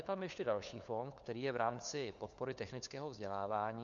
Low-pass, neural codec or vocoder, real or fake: 7.2 kHz; codec, 16 kHz, 4 kbps, FunCodec, trained on LibriTTS, 50 frames a second; fake